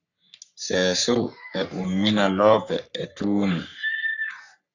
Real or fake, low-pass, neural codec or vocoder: fake; 7.2 kHz; codec, 44.1 kHz, 3.4 kbps, Pupu-Codec